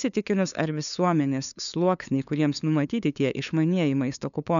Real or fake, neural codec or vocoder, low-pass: fake; codec, 16 kHz, 2 kbps, FunCodec, trained on LibriTTS, 25 frames a second; 7.2 kHz